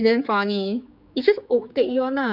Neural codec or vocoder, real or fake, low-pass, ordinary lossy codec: codec, 16 kHz, 4 kbps, X-Codec, HuBERT features, trained on general audio; fake; 5.4 kHz; none